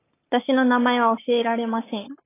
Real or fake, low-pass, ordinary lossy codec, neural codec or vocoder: fake; 3.6 kHz; AAC, 16 kbps; codec, 44.1 kHz, 7.8 kbps, Pupu-Codec